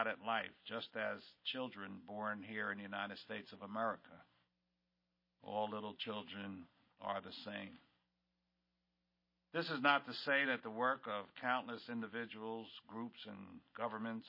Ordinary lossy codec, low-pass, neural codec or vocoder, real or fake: MP3, 24 kbps; 5.4 kHz; none; real